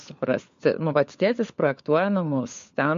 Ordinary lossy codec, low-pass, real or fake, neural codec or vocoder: MP3, 48 kbps; 7.2 kHz; fake; codec, 16 kHz, 4 kbps, FunCodec, trained on LibriTTS, 50 frames a second